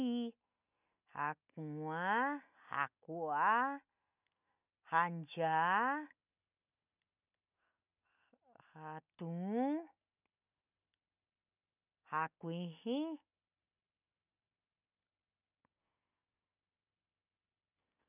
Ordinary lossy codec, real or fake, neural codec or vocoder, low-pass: none; real; none; 3.6 kHz